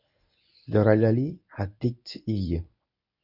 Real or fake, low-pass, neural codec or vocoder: fake; 5.4 kHz; codec, 24 kHz, 0.9 kbps, WavTokenizer, medium speech release version 1